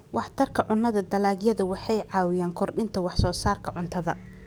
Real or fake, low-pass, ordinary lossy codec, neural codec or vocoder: fake; none; none; codec, 44.1 kHz, 7.8 kbps, DAC